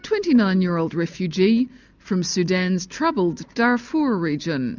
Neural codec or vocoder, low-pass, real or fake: none; 7.2 kHz; real